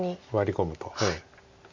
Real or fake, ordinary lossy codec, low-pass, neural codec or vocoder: real; MP3, 48 kbps; 7.2 kHz; none